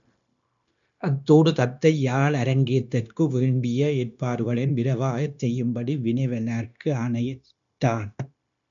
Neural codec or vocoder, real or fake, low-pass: codec, 16 kHz, 0.9 kbps, LongCat-Audio-Codec; fake; 7.2 kHz